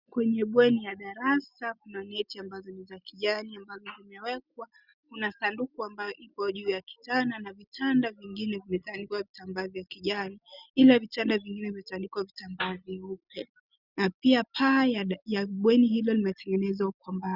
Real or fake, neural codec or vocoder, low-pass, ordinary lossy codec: real; none; 5.4 kHz; Opus, 64 kbps